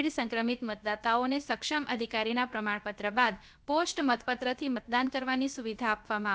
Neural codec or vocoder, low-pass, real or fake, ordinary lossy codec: codec, 16 kHz, about 1 kbps, DyCAST, with the encoder's durations; none; fake; none